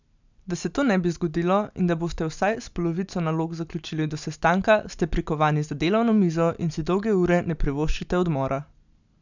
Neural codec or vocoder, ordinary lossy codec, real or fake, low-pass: none; none; real; 7.2 kHz